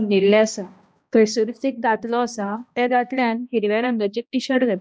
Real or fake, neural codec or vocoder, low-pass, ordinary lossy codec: fake; codec, 16 kHz, 1 kbps, X-Codec, HuBERT features, trained on balanced general audio; none; none